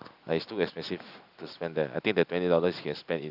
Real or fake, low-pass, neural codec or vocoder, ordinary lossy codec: real; 5.4 kHz; none; none